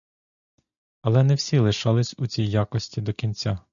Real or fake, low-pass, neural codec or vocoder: real; 7.2 kHz; none